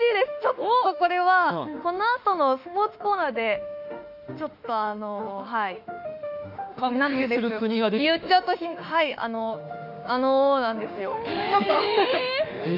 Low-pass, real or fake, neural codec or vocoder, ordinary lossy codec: 5.4 kHz; fake; autoencoder, 48 kHz, 32 numbers a frame, DAC-VAE, trained on Japanese speech; none